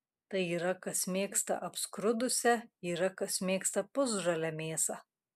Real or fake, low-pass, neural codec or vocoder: real; 14.4 kHz; none